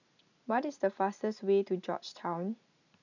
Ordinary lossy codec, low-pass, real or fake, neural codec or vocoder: none; 7.2 kHz; real; none